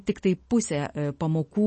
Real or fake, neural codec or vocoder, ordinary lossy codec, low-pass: real; none; MP3, 32 kbps; 10.8 kHz